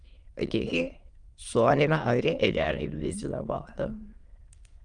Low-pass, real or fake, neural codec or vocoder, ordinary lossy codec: 9.9 kHz; fake; autoencoder, 22.05 kHz, a latent of 192 numbers a frame, VITS, trained on many speakers; Opus, 32 kbps